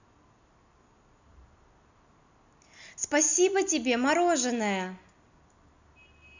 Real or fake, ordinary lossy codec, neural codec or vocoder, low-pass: real; none; none; 7.2 kHz